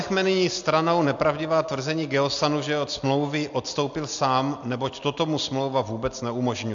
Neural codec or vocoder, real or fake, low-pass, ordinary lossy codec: none; real; 7.2 kHz; AAC, 64 kbps